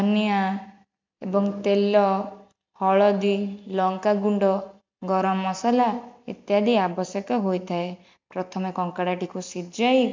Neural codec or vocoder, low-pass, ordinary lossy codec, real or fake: none; 7.2 kHz; MP3, 64 kbps; real